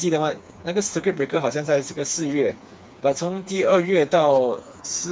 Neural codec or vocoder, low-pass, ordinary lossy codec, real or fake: codec, 16 kHz, 4 kbps, FreqCodec, smaller model; none; none; fake